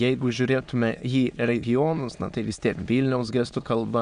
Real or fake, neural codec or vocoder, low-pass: fake; autoencoder, 22.05 kHz, a latent of 192 numbers a frame, VITS, trained on many speakers; 9.9 kHz